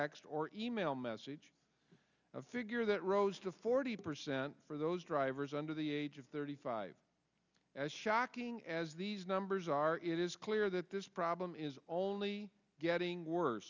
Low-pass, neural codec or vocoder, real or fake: 7.2 kHz; none; real